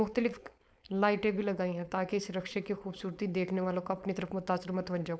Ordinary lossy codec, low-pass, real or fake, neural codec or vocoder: none; none; fake; codec, 16 kHz, 4.8 kbps, FACodec